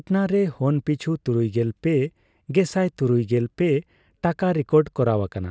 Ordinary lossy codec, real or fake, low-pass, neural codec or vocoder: none; real; none; none